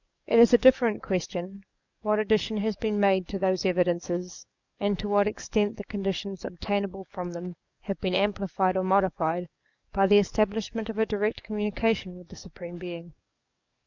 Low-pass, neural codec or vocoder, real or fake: 7.2 kHz; codec, 44.1 kHz, 7.8 kbps, DAC; fake